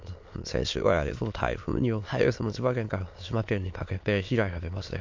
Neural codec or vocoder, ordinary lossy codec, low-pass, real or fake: autoencoder, 22.05 kHz, a latent of 192 numbers a frame, VITS, trained on many speakers; MP3, 48 kbps; 7.2 kHz; fake